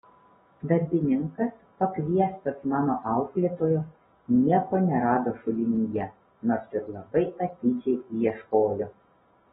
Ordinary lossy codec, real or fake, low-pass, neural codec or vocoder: AAC, 16 kbps; real; 7.2 kHz; none